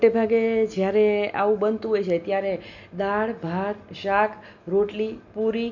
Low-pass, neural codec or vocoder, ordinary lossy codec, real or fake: 7.2 kHz; none; none; real